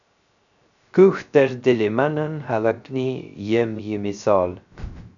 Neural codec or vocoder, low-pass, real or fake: codec, 16 kHz, 0.3 kbps, FocalCodec; 7.2 kHz; fake